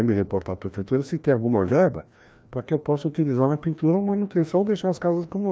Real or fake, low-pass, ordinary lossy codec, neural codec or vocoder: fake; none; none; codec, 16 kHz, 1 kbps, FreqCodec, larger model